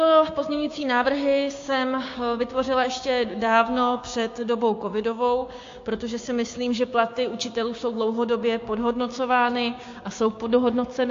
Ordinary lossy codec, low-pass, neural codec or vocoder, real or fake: AAC, 48 kbps; 7.2 kHz; codec, 16 kHz, 6 kbps, DAC; fake